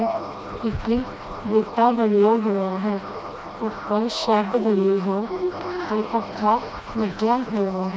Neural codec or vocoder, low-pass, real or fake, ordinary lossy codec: codec, 16 kHz, 1 kbps, FreqCodec, smaller model; none; fake; none